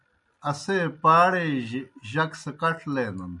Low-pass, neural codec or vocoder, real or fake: 10.8 kHz; none; real